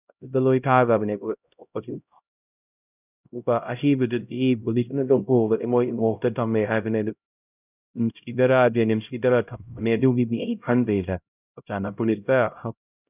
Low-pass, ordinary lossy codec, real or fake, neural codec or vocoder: 3.6 kHz; none; fake; codec, 16 kHz, 0.5 kbps, X-Codec, HuBERT features, trained on LibriSpeech